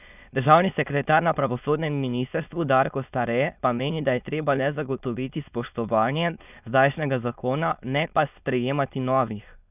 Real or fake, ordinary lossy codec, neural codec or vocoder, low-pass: fake; none; autoencoder, 22.05 kHz, a latent of 192 numbers a frame, VITS, trained on many speakers; 3.6 kHz